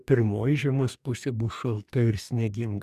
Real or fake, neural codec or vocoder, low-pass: fake; codec, 44.1 kHz, 2.6 kbps, DAC; 14.4 kHz